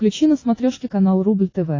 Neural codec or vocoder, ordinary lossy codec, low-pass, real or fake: none; AAC, 32 kbps; 7.2 kHz; real